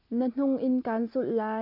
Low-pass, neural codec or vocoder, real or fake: 5.4 kHz; none; real